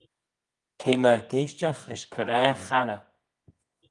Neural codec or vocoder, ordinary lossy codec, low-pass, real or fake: codec, 24 kHz, 0.9 kbps, WavTokenizer, medium music audio release; Opus, 32 kbps; 10.8 kHz; fake